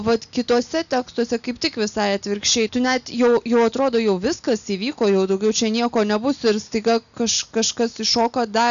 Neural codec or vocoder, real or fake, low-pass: none; real; 7.2 kHz